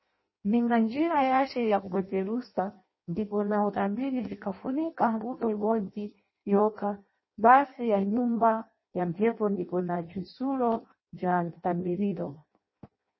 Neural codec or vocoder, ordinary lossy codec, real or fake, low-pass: codec, 16 kHz in and 24 kHz out, 0.6 kbps, FireRedTTS-2 codec; MP3, 24 kbps; fake; 7.2 kHz